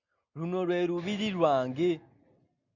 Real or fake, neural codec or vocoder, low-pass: real; none; 7.2 kHz